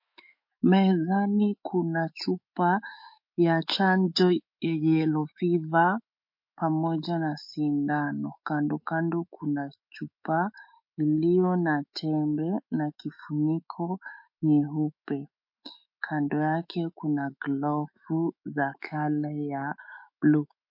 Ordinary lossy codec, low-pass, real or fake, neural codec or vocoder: MP3, 32 kbps; 5.4 kHz; fake; autoencoder, 48 kHz, 128 numbers a frame, DAC-VAE, trained on Japanese speech